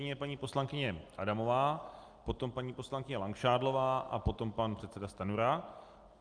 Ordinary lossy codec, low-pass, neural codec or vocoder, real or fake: Opus, 32 kbps; 9.9 kHz; none; real